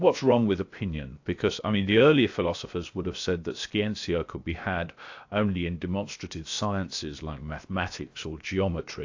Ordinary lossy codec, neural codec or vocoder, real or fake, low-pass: AAC, 48 kbps; codec, 16 kHz, 0.7 kbps, FocalCodec; fake; 7.2 kHz